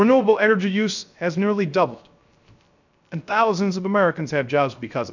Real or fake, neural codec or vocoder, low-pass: fake; codec, 16 kHz, 0.3 kbps, FocalCodec; 7.2 kHz